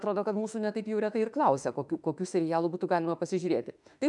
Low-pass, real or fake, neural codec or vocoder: 10.8 kHz; fake; autoencoder, 48 kHz, 32 numbers a frame, DAC-VAE, trained on Japanese speech